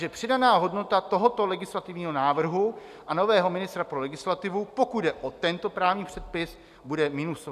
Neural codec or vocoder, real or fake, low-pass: none; real; 14.4 kHz